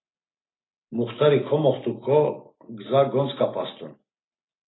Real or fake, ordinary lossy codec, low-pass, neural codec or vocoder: real; AAC, 16 kbps; 7.2 kHz; none